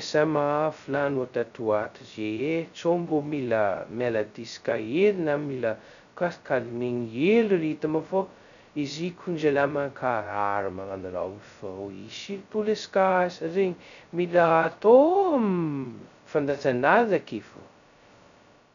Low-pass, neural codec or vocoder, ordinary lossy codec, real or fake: 7.2 kHz; codec, 16 kHz, 0.2 kbps, FocalCodec; none; fake